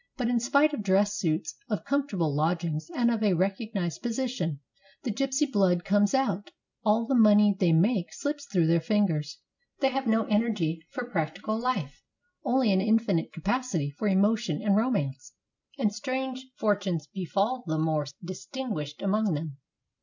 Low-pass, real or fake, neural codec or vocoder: 7.2 kHz; real; none